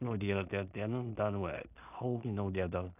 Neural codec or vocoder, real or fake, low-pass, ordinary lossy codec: codec, 16 kHz in and 24 kHz out, 0.4 kbps, LongCat-Audio-Codec, two codebook decoder; fake; 3.6 kHz; none